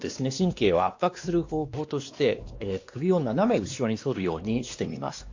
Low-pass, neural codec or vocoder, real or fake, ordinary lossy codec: 7.2 kHz; codec, 16 kHz, 2 kbps, X-Codec, WavLM features, trained on Multilingual LibriSpeech; fake; AAC, 48 kbps